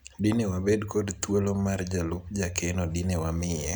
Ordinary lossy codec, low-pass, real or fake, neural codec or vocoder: none; none; real; none